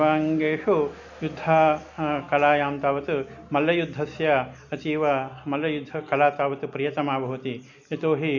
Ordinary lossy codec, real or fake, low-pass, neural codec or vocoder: none; real; 7.2 kHz; none